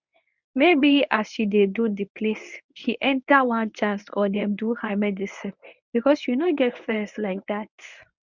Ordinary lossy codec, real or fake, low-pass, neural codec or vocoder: none; fake; 7.2 kHz; codec, 24 kHz, 0.9 kbps, WavTokenizer, medium speech release version 1